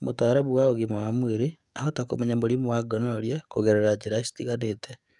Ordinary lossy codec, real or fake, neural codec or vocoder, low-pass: none; fake; codec, 44.1 kHz, 7.8 kbps, DAC; 10.8 kHz